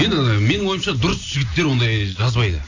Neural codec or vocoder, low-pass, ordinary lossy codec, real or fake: none; 7.2 kHz; AAC, 48 kbps; real